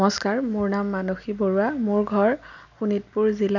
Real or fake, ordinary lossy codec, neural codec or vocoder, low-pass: real; none; none; 7.2 kHz